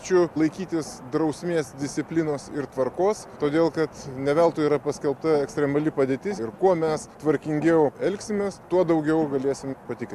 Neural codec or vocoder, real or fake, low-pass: none; real; 14.4 kHz